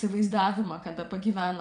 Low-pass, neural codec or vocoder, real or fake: 9.9 kHz; vocoder, 22.05 kHz, 80 mel bands, WaveNeXt; fake